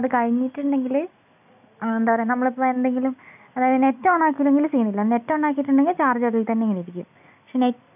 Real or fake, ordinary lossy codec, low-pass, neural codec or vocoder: real; none; 3.6 kHz; none